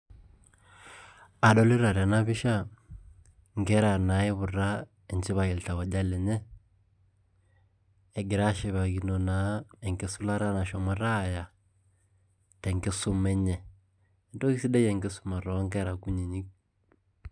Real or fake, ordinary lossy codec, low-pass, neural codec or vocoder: real; none; 9.9 kHz; none